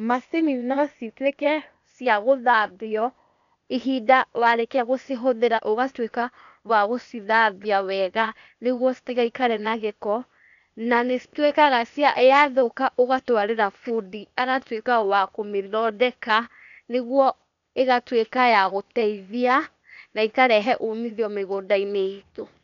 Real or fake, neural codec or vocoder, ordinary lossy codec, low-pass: fake; codec, 16 kHz, 0.8 kbps, ZipCodec; none; 7.2 kHz